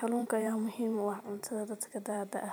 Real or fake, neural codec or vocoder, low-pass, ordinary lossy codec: fake; vocoder, 44.1 kHz, 128 mel bands every 512 samples, BigVGAN v2; none; none